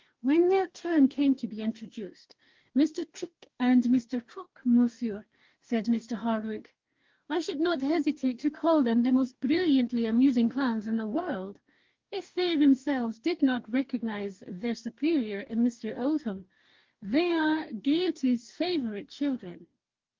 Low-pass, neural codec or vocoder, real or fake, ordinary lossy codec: 7.2 kHz; codec, 44.1 kHz, 2.6 kbps, DAC; fake; Opus, 32 kbps